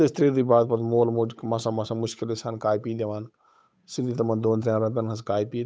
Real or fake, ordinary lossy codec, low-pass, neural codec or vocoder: fake; none; none; codec, 16 kHz, 8 kbps, FunCodec, trained on Chinese and English, 25 frames a second